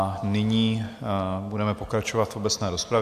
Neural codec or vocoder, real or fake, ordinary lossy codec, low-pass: none; real; AAC, 96 kbps; 14.4 kHz